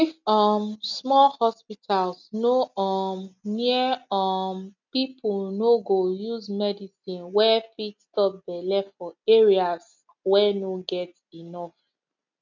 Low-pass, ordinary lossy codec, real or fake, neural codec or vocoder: 7.2 kHz; none; real; none